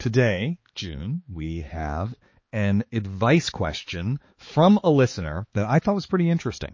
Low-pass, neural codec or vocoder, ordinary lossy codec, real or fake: 7.2 kHz; codec, 16 kHz, 4 kbps, X-Codec, HuBERT features, trained on balanced general audio; MP3, 32 kbps; fake